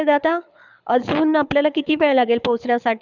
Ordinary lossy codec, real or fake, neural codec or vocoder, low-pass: none; fake; codec, 24 kHz, 6 kbps, HILCodec; 7.2 kHz